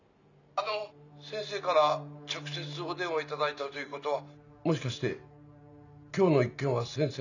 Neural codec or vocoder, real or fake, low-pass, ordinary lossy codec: none; real; 7.2 kHz; none